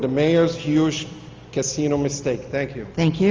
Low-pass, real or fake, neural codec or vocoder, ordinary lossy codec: 7.2 kHz; real; none; Opus, 32 kbps